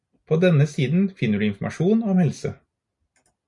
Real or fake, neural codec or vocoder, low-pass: real; none; 10.8 kHz